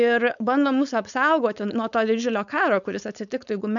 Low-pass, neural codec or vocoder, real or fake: 7.2 kHz; codec, 16 kHz, 4.8 kbps, FACodec; fake